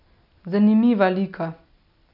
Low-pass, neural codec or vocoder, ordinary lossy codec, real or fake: 5.4 kHz; none; none; real